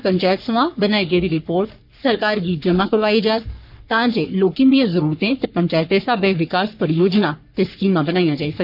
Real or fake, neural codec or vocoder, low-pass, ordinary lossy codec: fake; codec, 44.1 kHz, 3.4 kbps, Pupu-Codec; 5.4 kHz; none